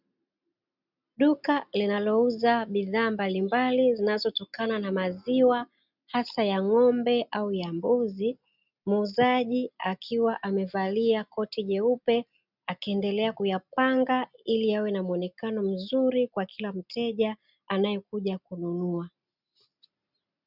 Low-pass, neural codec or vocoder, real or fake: 5.4 kHz; none; real